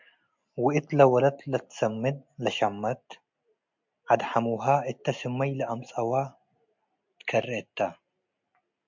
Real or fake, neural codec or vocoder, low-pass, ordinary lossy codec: real; none; 7.2 kHz; MP3, 64 kbps